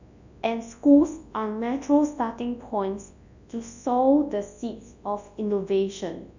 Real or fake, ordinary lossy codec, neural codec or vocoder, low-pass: fake; none; codec, 24 kHz, 0.9 kbps, WavTokenizer, large speech release; 7.2 kHz